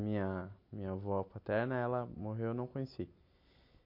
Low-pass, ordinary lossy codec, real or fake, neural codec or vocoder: 5.4 kHz; MP3, 32 kbps; real; none